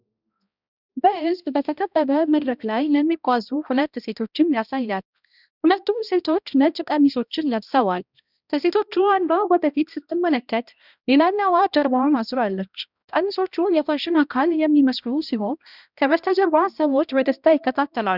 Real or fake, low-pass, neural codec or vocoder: fake; 5.4 kHz; codec, 16 kHz, 1 kbps, X-Codec, HuBERT features, trained on balanced general audio